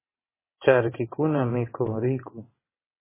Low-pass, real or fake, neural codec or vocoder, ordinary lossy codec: 3.6 kHz; fake; vocoder, 22.05 kHz, 80 mel bands, WaveNeXt; MP3, 16 kbps